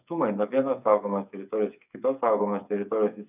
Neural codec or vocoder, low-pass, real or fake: codec, 16 kHz, 8 kbps, FreqCodec, smaller model; 3.6 kHz; fake